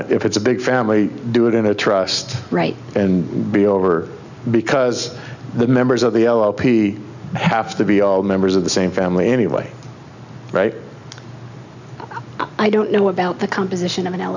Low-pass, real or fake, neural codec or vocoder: 7.2 kHz; real; none